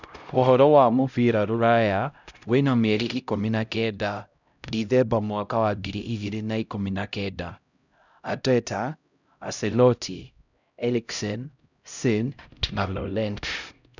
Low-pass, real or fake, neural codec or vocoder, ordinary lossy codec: 7.2 kHz; fake; codec, 16 kHz, 0.5 kbps, X-Codec, HuBERT features, trained on LibriSpeech; none